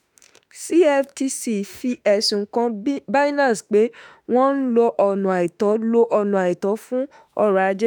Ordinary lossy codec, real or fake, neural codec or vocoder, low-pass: none; fake; autoencoder, 48 kHz, 32 numbers a frame, DAC-VAE, trained on Japanese speech; none